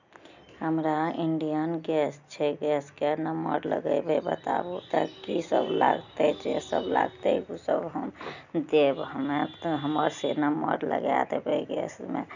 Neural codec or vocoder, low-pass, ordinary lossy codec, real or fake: none; 7.2 kHz; none; real